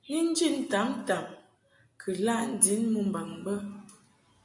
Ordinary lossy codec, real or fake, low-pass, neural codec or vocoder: AAC, 64 kbps; real; 10.8 kHz; none